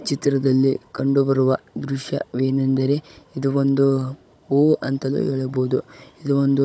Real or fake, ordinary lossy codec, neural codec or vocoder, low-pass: fake; none; codec, 16 kHz, 16 kbps, FunCodec, trained on Chinese and English, 50 frames a second; none